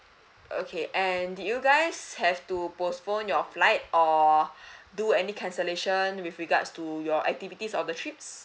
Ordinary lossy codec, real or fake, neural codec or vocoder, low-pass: none; real; none; none